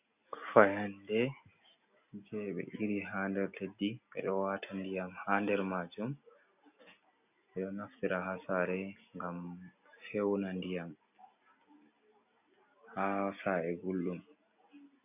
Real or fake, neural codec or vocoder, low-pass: real; none; 3.6 kHz